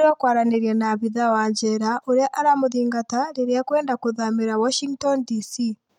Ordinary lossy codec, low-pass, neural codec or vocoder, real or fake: none; 19.8 kHz; none; real